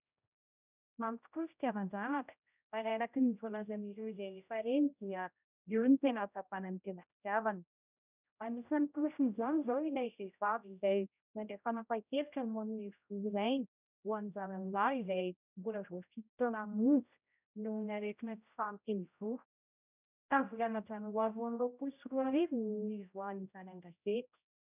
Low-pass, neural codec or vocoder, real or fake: 3.6 kHz; codec, 16 kHz, 0.5 kbps, X-Codec, HuBERT features, trained on general audio; fake